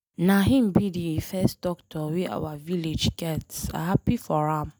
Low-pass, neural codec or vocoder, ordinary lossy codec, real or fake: none; none; none; real